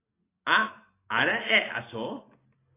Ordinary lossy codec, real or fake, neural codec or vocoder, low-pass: AAC, 16 kbps; real; none; 3.6 kHz